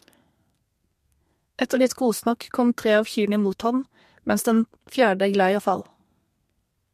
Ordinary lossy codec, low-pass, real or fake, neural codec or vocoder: MP3, 64 kbps; 14.4 kHz; fake; codec, 32 kHz, 1.9 kbps, SNAC